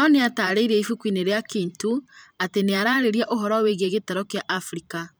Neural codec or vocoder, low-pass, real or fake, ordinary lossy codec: vocoder, 44.1 kHz, 128 mel bands every 512 samples, BigVGAN v2; none; fake; none